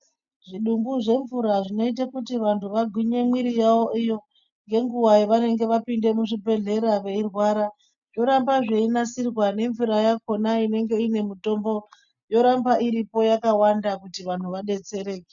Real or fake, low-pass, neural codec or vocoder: real; 7.2 kHz; none